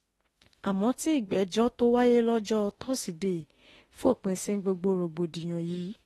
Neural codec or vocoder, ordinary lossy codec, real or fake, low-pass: autoencoder, 48 kHz, 32 numbers a frame, DAC-VAE, trained on Japanese speech; AAC, 32 kbps; fake; 19.8 kHz